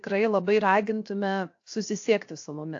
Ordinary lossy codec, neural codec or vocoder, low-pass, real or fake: AAC, 48 kbps; codec, 16 kHz, 0.7 kbps, FocalCodec; 7.2 kHz; fake